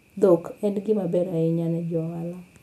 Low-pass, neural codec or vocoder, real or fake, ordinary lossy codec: 14.4 kHz; none; real; none